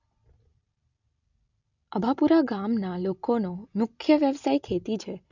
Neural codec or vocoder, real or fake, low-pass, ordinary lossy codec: none; real; 7.2 kHz; none